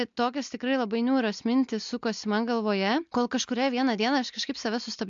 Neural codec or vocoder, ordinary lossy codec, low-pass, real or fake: none; MP3, 64 kbps; 7.2 kHz; real